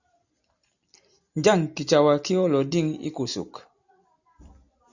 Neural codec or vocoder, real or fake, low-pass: none; real; 7.2 kHz